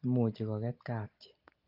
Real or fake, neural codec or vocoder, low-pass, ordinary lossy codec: real; none; 5.4 kHz; Opus, 24 kbps